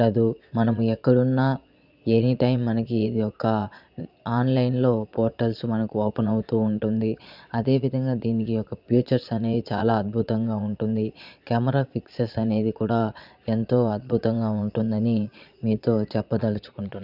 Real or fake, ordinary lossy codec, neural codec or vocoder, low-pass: fake; none; vocoder, 22.05 kHz, 80 mel bands, Vocos; 5.4 kHz